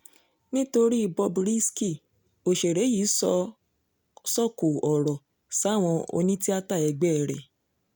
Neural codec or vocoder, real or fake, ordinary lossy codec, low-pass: none; real; none; none